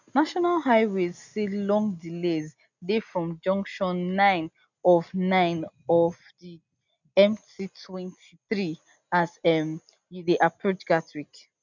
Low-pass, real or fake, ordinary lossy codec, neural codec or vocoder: 7.2 kHz; real; none; none